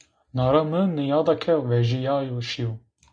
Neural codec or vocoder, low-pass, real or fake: none; 9.9 kHz; real